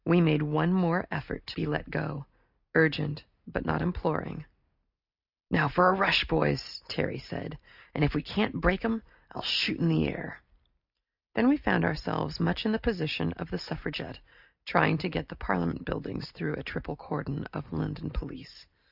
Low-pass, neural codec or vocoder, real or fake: 5.4 kHz; none; real